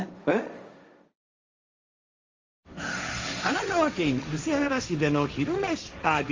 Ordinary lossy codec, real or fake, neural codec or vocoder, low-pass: Opus, 32 kbps; fake; codec, 16 kHz, 1.1 kbps, Voila-Tokenizer; 7.2 kHz